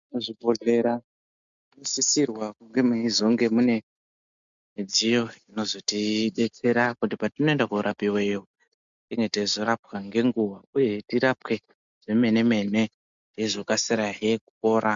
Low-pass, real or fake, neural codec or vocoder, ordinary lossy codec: 7.2 kHz; real; none; MP3, 64 kbps